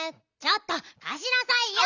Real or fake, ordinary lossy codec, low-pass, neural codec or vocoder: real; none; 7.2 kHz; none